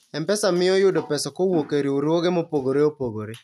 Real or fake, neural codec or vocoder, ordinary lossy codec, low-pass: real; none; none; 14.4 kHz